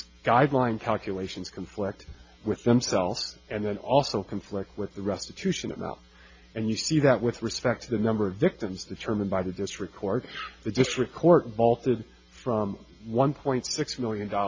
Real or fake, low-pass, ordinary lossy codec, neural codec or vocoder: real; 7.2 kHz; MP3, 48 kbps; none